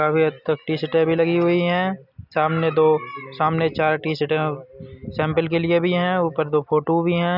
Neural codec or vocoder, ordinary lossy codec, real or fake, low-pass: none; none; real; 5.4 kHz